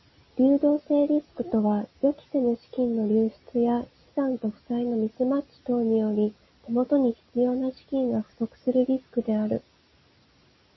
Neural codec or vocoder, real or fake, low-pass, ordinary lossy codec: none; real; 7.2 kHz; MP3, 24 kbps